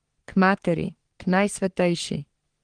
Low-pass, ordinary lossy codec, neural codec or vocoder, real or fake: 9.9 kHz; Opus, 24 kbps; codec, 44.1 kHz, 3.4 kbps, Pupu-Codec; fake